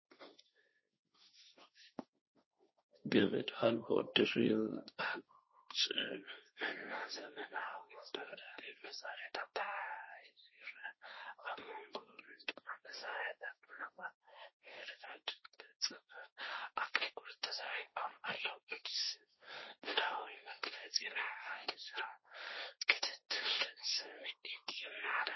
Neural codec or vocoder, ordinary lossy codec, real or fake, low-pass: codec, 16 kHz, 1.1 kbps, Voila-Tokenizer; MP3, 24 kbps; fake; 7.2 kHz